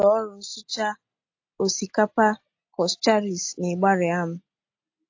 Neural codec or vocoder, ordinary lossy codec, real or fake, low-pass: none; AAC, 48 kbps; real; 7.2 kHz